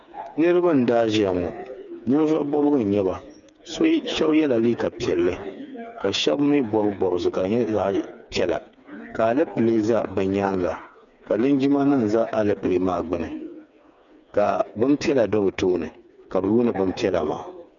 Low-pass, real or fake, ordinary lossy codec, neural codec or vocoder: 7.2 kHz; fake; MP3, 96 kbps; codec, 16 kHz, 4 kbps, FreqCodec, smaller model